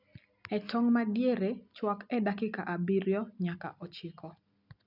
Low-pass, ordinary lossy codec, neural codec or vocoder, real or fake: 5.4 kHz; none; none; real